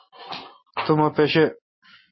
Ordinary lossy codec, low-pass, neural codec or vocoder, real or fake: MP3, 24 kbps; 7.2 kHz; none; real